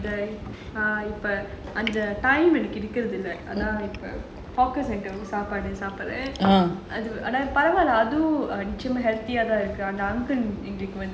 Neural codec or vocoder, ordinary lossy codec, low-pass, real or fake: none; none; none; real